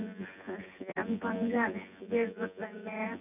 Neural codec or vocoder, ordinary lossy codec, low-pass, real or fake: vocoder, 24 kHz, 100 mel bands, Vocos; none; 3.6 kHz; fake